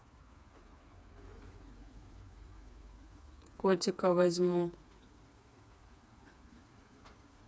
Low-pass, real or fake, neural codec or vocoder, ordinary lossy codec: none; fake; codec, 16 kHz, 4 kbps, FreqCodec, smaller model; none